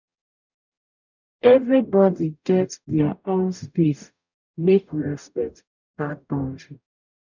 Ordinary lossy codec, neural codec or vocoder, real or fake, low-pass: none; codec, 44.1 kHz, 0.9 kbps, DAC; fake; 7.2 kHz